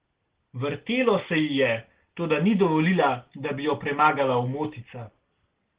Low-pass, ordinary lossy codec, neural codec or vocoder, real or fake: 3.6 kHz; Opus, 16 kbps; none; real